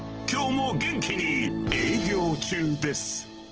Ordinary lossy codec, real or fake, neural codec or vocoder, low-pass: Opus, 16 kbps; real; none; 7.2 kHz